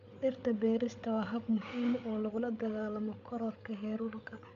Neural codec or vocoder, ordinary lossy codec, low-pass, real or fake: codec, 16 kHz, 8 kbps, FreqCodec, larger model; none; 7.2 kHz; fake